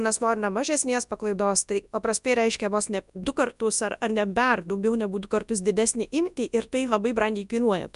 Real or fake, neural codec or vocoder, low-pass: fake; codec, 24 kHz, 0.9 kbps, WavTokenizer, large speech release; 10.8 kHz